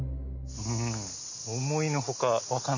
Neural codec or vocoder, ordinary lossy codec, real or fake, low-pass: none; none; real; 7.2 kHz